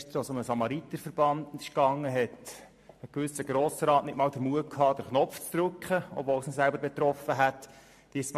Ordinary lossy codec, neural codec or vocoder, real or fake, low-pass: MP3, 64 kbps; vocoder, 44.1 kHz, 128 mel bands every 512 samples, BigVGAN v2; fake; 14.4 kHz